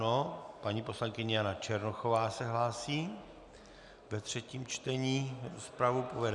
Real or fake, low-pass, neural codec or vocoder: real; 10.8 kHz; none